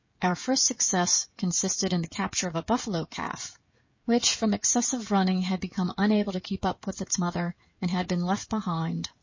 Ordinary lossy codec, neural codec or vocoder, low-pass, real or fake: MP3, 32 kbps; codec, 16 kHz, 16 kbps, FreqCodec, smaller model; 7.2 kHz; fake